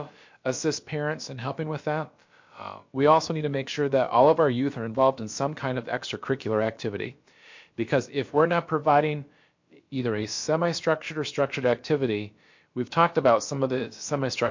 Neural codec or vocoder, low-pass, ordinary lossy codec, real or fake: codec, 16 kHz, about 1 kbps, DyCAST, with the encoder's durations; 7.2 kHz; MP3, 48 kbps; fake